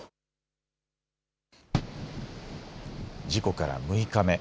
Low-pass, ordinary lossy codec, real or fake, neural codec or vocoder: none; none; real; none